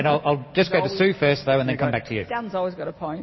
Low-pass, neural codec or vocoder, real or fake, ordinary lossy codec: 7.2 kHz; none; real; MP3, 24 kbps